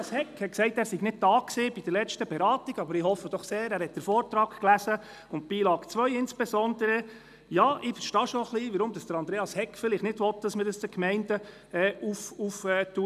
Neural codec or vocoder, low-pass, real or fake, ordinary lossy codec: none; 14.4 kHz; real; none